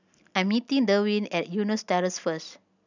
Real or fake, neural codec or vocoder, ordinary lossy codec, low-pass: real; none; none; 7.2 kHz